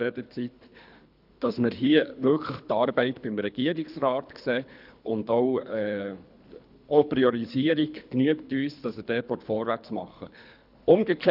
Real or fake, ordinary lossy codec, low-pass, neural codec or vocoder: fake; none; 5.4 kHz; codec, 24 kHz, 3 kbps, HILCodec